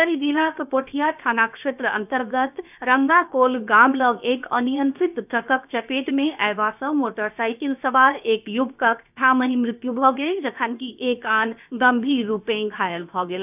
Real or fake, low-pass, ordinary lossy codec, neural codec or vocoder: fake; 3.6 kHz; none; codec, 16 kHz, 0.7 kbps, FocalCodec